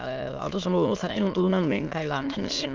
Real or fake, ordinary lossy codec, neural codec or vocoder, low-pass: fake; Opus, 24 kbps; autoencoder, 22.05 kHz, a latent of 192 numbers a frame, VITS, trained on many speakers; 7.2 kHz